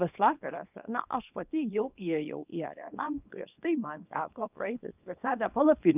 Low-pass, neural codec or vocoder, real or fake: 3.6 kHz; codec, 24 kHz, 0.9 kbps, WavTokenizer, small release; fake